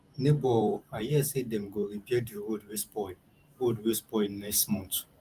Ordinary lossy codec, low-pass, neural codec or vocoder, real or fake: Opus, 32 kbps; 14.4 kHz; vocoder, 44.1 kHz, 128 mel bands every 512 samples, BigVGAN v2; fake